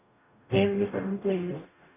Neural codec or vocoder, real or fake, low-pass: codec, 44.1 kHz, 0.9 kbps, DAC; fake; 3.6 kHz